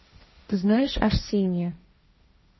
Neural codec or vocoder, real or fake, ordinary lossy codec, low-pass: codec, 16 kHz, 1.1 kbps, Voila-Tokenizer; fake; MP3, 24 kbps; 7.2 kHz